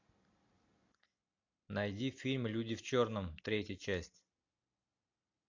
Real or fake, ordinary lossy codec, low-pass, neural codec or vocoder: real; AAC, 48 kbps; 7.2 kHz; none